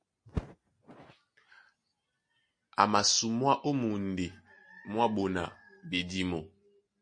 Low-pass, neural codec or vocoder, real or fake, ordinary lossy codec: 9.9 kHz; none; real; MP3, 48 kbps